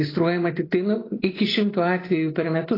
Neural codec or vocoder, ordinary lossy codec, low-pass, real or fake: none; AAC, 24 kbps; 5.4 kHz; real